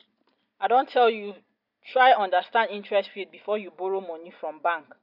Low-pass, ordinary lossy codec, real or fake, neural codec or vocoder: 5.4 kHz; none; real; none